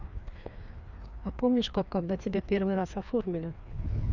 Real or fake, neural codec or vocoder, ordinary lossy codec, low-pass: fake; codec, 16 kHz, 2 kbps, FreqCodec, larger model; none; 7.2 kHz